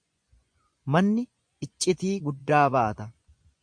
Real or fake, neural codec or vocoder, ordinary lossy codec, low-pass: fake; vocoder, 24 kHz, 100 mel bands, Vocos; MP3, 64 kbps; 9.9 kHz